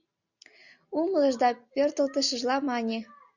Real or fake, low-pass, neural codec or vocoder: real; 7.2 kHz; none